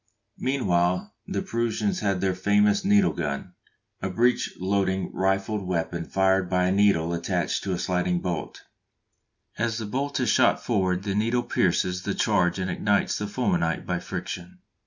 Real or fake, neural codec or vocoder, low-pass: real; none; 7.2 kHz